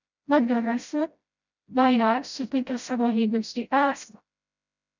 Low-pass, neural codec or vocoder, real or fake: 7.2 kHz; codec, 16 kHz, 0.5 kbps, FreqCodec, smaller model; fake